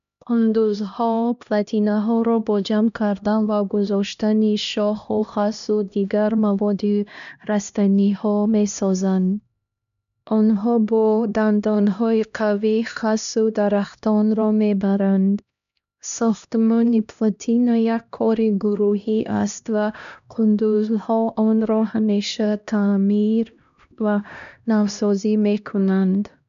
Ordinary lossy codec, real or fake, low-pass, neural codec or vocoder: none; fake; 7.2 kHz; codec, 16 kHz, 1 kbps, X-Codec, HuBERT features, trained on LibriSpeech